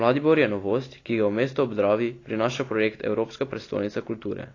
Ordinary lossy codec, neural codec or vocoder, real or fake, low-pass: AAC, 32 kbps; none; real; 7.2 kHz